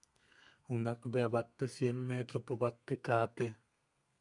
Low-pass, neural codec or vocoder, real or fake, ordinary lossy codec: 10.8 kHz; codec, 32 kHz, 1.9 kbps, SNAC; fake; MP3, 96 kbps